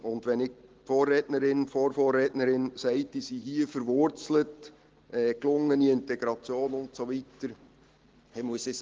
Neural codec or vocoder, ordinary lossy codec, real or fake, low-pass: none; Opus, 16 kbps; real; 7.2 kHz